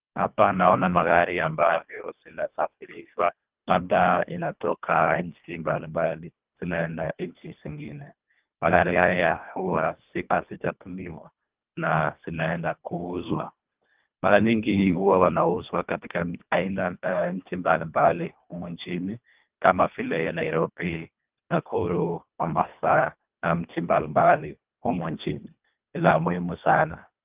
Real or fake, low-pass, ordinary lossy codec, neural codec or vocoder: fake; 3.6 kHz; Opus, 24 kbps; codec, 24 kHz, 1.5 kbps, HILCodec